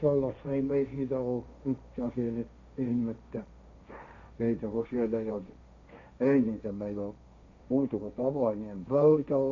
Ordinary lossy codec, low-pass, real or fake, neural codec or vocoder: MP3, 64 kbps; 7.2 kHz; fake; codec, 16 kHz, 1.1 kbps, Voila-Tokenizer